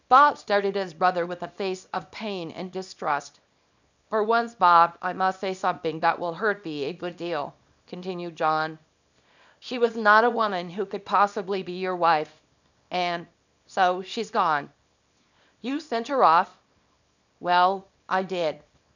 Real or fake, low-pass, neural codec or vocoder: fake; 7.2 kHz; codec, 24 kHz, 0.9 kbps, WavTokenizer, small release